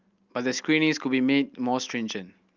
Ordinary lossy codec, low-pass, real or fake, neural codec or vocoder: Opus, 24 kbps; 7.2 kHz; real; none